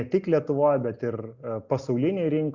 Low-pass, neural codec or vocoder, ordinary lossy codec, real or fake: 7.2 kHz; none; Opus, 64 kbps; real